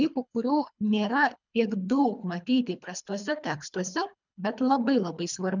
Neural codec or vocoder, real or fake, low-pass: codec, 24 kHz, 3 kbps, HILCodec; fake; 7.2 kHz